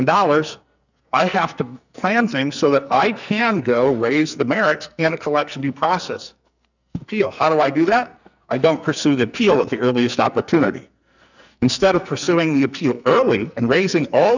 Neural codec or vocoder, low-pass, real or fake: codec, 44.1 kHz, 2.6 kbps, SNAC; 7.2 kHz; fake